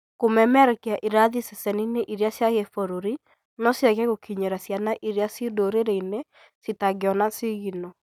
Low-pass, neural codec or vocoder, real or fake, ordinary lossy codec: 19.8 kHz; none; real; none